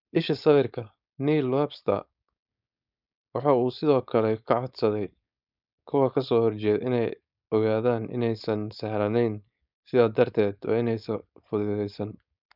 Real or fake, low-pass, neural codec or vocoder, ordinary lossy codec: fake; 5.4 kHz; codec, 16 kHz, 4.8 kbps, FACodec; none